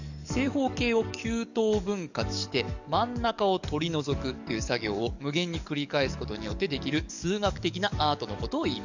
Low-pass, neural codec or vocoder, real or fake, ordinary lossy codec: 7.2 kHz; codec, 44.1 kHz, 7.8 kbps, DAC; fake; none